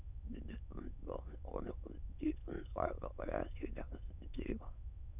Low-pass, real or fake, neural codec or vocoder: 3.6 kHz; fake; autoencoder, 22.05 kHz, a latent of 192 numbers a frame, VITS, trained on many speakers